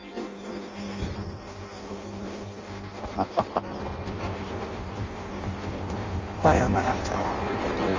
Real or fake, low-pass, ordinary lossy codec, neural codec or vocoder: fake; 7.2 kHz; Opus, 32 kbps; codec, 16 kHz in and 24 kHz out, 0.6 kbps, FireRedTTS-2 codec